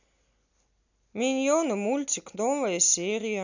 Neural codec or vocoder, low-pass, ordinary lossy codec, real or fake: none; 7.2 kHz; none; real